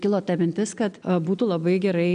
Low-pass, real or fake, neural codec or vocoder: 9.9 kHz; real; none